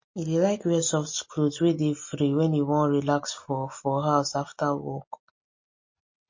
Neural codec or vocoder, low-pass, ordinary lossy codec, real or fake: none; 7.2 kHz; MP3, 32 kbps; real